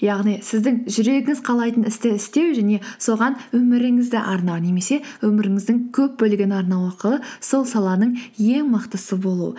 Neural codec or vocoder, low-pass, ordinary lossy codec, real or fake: none; none; none; real